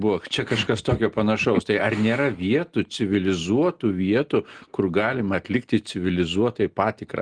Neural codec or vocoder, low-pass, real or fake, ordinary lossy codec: none; 9.9 kHz; real; Opus, 24 kbps